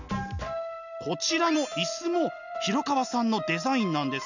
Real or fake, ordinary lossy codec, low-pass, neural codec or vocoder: real; none; 7.2 kHz; none